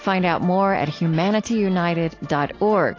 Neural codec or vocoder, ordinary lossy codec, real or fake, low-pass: none; AAC, 32 kbps; real; 7.2 kHz